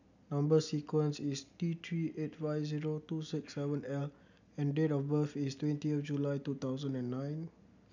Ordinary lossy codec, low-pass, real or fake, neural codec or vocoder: none; 7.2 kHz; real; none